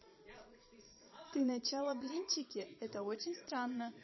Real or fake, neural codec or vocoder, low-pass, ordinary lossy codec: fake; vocoder, 22.05 kHz, 80 mel bands, Vocos; 7.2 kHz; MP3, 24 kbps